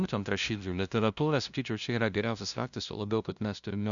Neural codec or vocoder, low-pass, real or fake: codec, 16 kHz, 0.5 kbps, FunCodec, trained on LibriTTS, 25 frames a second; 7.2 kHz; fake